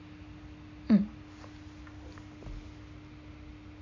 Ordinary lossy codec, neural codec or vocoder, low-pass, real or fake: MP3, 64 kbps; none; 7.2 kHz; real